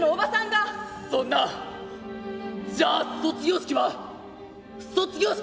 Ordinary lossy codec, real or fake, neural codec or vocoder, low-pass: none; real; none; none